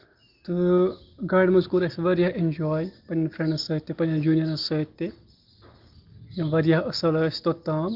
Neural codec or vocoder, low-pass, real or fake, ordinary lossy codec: none; 5.4 kHz; real; Opus, 32 kbps